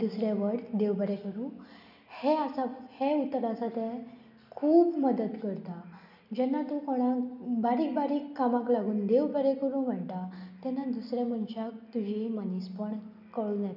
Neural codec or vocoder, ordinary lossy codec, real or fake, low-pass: none; none; real; 5.4 kHz